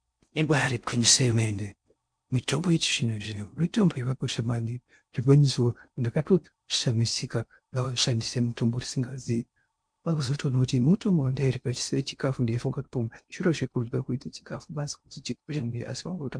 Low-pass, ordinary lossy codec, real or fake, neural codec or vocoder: 9.9 kHz; MP3, 64 kbps; fake; codec, 16 kHz in and 24 kHz out, 0.6 kbps, FocalCodec, streaming, 4096 codes